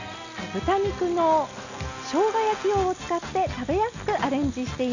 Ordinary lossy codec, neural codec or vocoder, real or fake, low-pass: none; none; real; 7.2 kHz